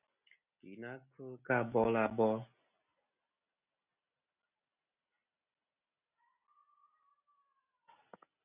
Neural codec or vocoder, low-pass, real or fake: none; 3.6 kHz; real